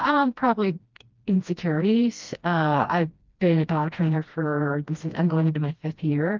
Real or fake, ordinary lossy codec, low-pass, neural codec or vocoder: fake; Opus, 24 kbps; 7.2 kHz; codec, 16 kHz, 1 kbps, FreqCodec, smaller model